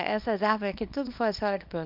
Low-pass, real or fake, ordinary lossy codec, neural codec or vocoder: 5.4 kHz; fake; none; codec, 24 kHz, 0.9 kbps, WavTokenizer, small release